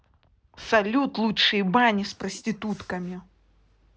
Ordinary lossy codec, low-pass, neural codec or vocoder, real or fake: none; none; none; real